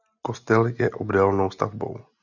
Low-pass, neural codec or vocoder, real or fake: 7.2 kHz; none; real